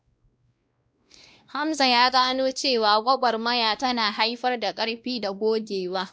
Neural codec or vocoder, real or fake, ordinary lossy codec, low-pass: codec, 16 kHz, 1 kbps, X-Codec, WavLM features, trained on Multilingual LibriSpeech; fake; none; none